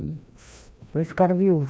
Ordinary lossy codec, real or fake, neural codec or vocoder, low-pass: none; fake; codec, 16 kHz, 1 kbps, FreqCodec, larger model; none